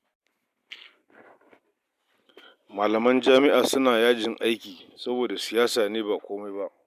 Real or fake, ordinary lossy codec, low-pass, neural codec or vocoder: real; none; 14.4 kHz; none